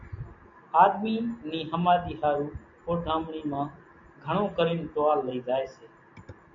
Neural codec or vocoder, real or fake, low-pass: none; real; 7.2 kHz